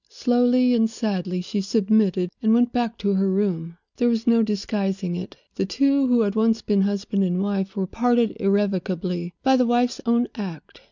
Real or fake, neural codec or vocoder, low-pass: real; none; 7.2 kHz